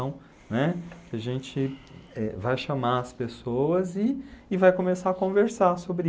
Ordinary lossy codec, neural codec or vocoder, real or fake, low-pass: none; none; real; none